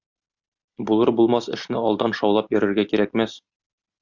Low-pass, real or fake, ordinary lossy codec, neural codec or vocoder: 7.2 kHz; real; Opus, 64 kbps; none